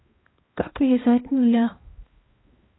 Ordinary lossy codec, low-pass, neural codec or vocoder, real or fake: AAC, 16 kbps; 7.2 kHz; codec, 16 kHz, 1 kbps, X-Codec, HuBERT features, trained on balanced general audio; fake